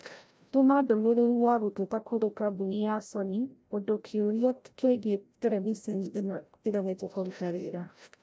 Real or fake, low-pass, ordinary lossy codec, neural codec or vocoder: fake; none; none; codec, 16 kHz, 0.5 kbps, FreqCodec, larger model